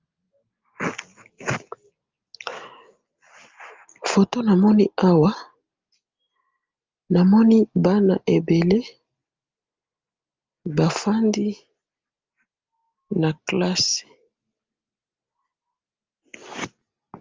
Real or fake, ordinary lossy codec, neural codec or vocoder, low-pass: real; Opus, 24 kbps; none; 7.2 kHz